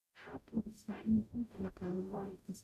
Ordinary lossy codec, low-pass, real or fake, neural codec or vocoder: none; 14.4 kHz; fake; codec, 44.1 kHz, 0.9 kbps, DAC